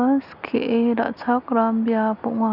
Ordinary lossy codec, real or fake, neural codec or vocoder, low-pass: none; real; none; 5.4 kHz